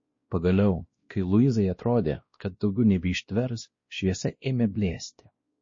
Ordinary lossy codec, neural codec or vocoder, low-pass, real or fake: MP3, 32 kbps; codec, 16 kHz, 1 kbps, X-Codec, WavLM features, trained on Multilingual LibriSpeech; 7.2 kHz; fake